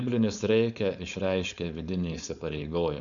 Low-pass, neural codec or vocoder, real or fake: 7.2 kHz; codec, 16 kHz, 4.8 kbps, FACodec; fake